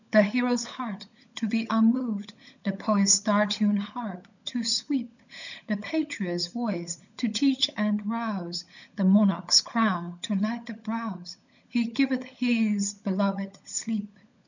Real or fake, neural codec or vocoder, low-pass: fake; codec, 16 kHz, 16 kbps, FunCodec, trained on LibriTTS, 50 frames a second; 7.2 kHz